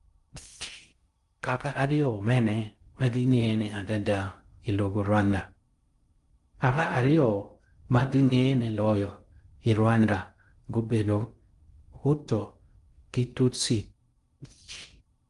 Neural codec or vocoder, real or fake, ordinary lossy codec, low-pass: codec, 16 kHz in and 24 kHz out, 0.6 kbps, FocalCodec, streaming, 4096 codes; fake; Opus, 32 kbps; 10.8 kHz